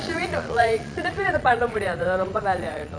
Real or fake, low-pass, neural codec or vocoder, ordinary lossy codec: fake; 9.9 kHz; vocoder, 22.05 kHz, 80 mel bands, Vocos; none